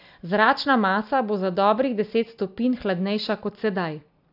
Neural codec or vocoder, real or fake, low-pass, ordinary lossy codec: none; real; 5.4 kHz; AAC, 48 kbps